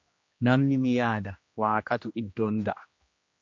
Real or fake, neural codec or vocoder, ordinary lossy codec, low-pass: fake; codec, 16 kHz, 1 kbps, X-Codec, HuBERT features, trained on general audio; MP3, 48 kbps; 7.2 kHz